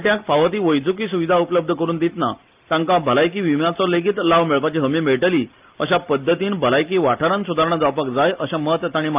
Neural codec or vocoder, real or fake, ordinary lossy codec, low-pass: none; real; Opus, 24 kbps; 3.6 kHz